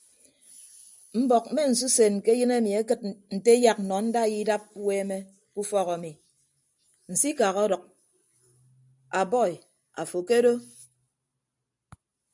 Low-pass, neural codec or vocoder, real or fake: 10.8 kHz; none; real